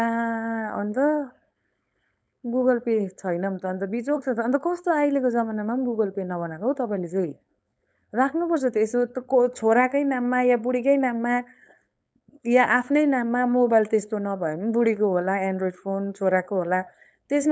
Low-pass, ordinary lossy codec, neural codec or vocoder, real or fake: none; none; codec, 16 kHz, 4.8 kbps, FACodec; fake